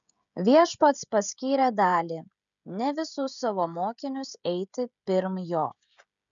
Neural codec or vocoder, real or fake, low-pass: codec, 16 kHz, 16 kbps, FreqCodec, smaller model; fake; 7.2 kHz